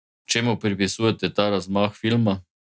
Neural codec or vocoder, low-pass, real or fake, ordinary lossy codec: none; none; real; none